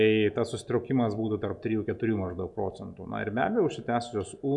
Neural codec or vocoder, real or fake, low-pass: none; real; 10.8 kHz